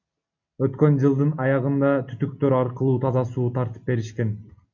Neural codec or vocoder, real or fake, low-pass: none; real; 7.2 kHz